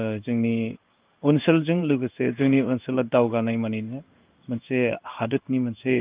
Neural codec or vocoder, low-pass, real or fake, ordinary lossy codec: codec, 16 kHz in and 24 kHz out, 1 kbps, XY-Tokenizer; 3.6 kHz; fake; Opus, 24 kbps